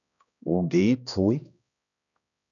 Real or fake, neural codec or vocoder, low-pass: fake; codec, 16 kHz, 1 kbps, X-Codec, HuBERT features, trained on balanced general audio; 7.2 kHz